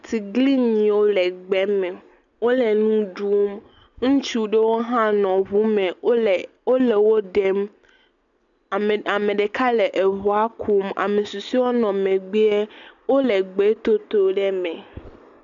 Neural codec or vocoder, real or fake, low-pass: none; real; 7.2 kHz